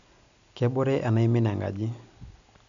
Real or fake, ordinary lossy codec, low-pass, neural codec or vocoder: real; none; 7.2 kHz; none